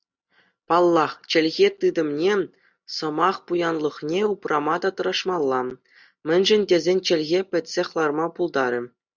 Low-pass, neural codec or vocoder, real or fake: 7.2 kHz; none; real